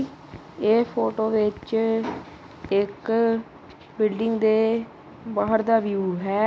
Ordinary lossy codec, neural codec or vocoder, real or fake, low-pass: none; none; real; none